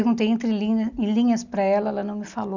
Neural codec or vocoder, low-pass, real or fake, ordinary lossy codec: none; 7.2 kHz; real; none